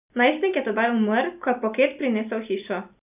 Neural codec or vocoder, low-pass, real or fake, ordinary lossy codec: none; 3.6 kHz; real; none